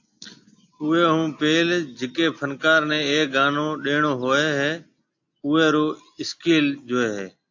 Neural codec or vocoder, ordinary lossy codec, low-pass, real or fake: none; AAC, 48 kbps; 7.2 kHz; real